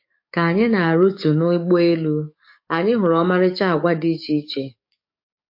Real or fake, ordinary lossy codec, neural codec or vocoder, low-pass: fake; MP3, 32 kbps; codec, 44.1 kHz, 7.8 kbps, DAC; 5.4 kHz